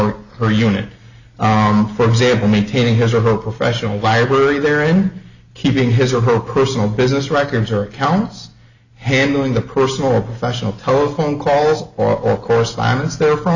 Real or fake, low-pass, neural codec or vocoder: real; 7.2 kHz; none